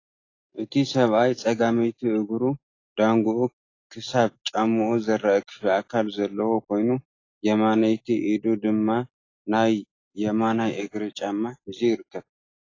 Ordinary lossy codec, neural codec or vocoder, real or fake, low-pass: AAC, 32 kbps; none; real; 7.2 kHz